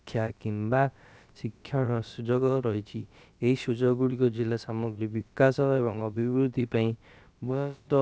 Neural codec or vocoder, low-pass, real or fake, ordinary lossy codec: codec, 16 kHz, about 1 kbps, DyCAST, with the encoder's durations; none; fake; none